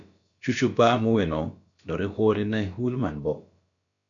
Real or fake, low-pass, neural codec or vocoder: fake; 7.2 kHz; codec, 16 kHz, about 1 kbps, DyCAST, with the encoder's durations